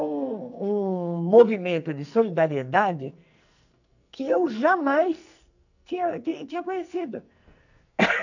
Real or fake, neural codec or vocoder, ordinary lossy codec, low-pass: fake; codec, 44.1 kHz, 2.6 kbps, SNAC; none; 7.2 kHz